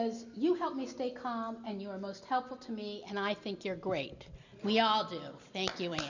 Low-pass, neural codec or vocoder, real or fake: 7.2 kHz; none; real